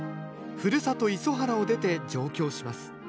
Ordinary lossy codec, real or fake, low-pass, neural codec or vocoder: none; real; none; none